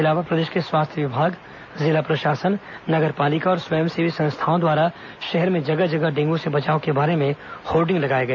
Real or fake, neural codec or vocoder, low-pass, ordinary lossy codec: real; none; 7.2 kHz; none